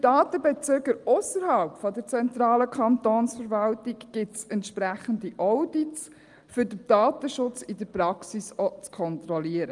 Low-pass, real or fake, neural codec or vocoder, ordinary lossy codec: 10.8 kHz; real; none; Opus, 32 kbps